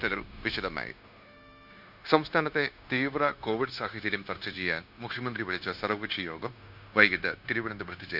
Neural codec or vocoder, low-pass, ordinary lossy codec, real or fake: codec, 16 kHz, 0.9 kbps, LongCat-Audio-Codec; 5.4 kHz; none; fake